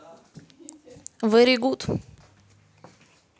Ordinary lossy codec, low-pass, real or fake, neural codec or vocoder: none; none; real; none